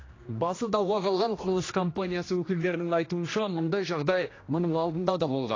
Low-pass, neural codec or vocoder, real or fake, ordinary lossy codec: 7.2 kHz; codec, 16 kHz, 1 kbps, X-Codec, HuBERT features, trained on general audio; fake; AAC, 32 kbps